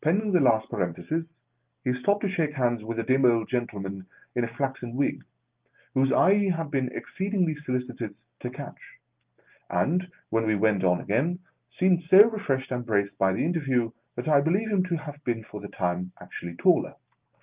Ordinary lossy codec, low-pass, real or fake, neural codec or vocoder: Opus, 24 kbps; 3.6 kHz; real; none